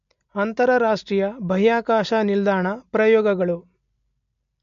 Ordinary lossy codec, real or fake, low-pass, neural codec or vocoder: MP3, 48 kbps; real; 7.2 kHz; none